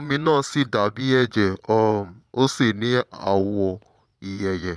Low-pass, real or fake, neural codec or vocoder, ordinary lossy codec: none; fake; vocoder, 22.05 kHz, 80 mel bands, Vocos; none